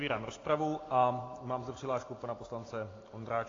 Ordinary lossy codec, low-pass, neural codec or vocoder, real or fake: AAC, 32 kbps; 7.2 kHz; none; real